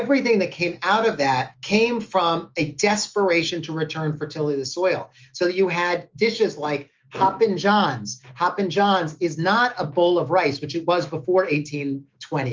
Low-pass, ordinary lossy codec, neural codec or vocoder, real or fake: 7.2 kHz; Opus, 32 kbps; autoencoder, 48 kHz, 128 numbers a frame, DAC-VAE, trained on Japanese speech; fake